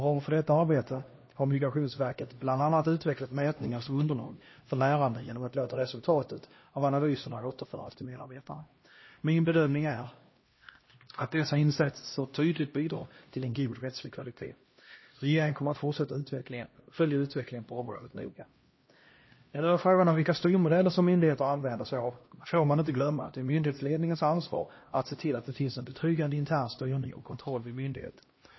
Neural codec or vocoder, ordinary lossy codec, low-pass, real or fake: codec, 16 kHz, 1 kbps, X-Codec, HuBERT features, trained on LibriSpeech; MP3, 24 kbps; 7.2 kHz; fake